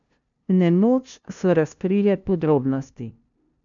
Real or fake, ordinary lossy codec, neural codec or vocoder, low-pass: fake; none; codec, 16 kHz, 0.5 kbps, FunCodec, trained on LibriTTS, 25 frames a second; 7.2 kHz